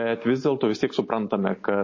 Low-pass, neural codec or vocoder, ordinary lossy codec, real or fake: 7.2 kHz; none; MP3, 32 kbps; real